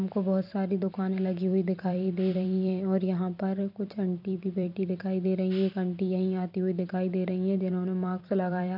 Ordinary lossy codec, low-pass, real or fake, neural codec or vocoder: none; 5.4 kHz; fake; vocoder, 44.1 kHz, 128 mel bands every 256 samples, BigVGAN v2